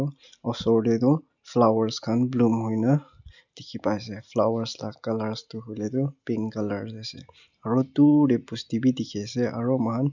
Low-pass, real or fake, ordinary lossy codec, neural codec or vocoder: 7.2 kHz; real; none; none